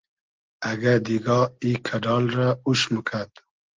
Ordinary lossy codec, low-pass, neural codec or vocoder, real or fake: Opus, 16 kbps; 7.2 kHz; none; real